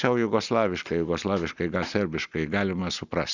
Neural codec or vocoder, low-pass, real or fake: none; 7.2 kHz; real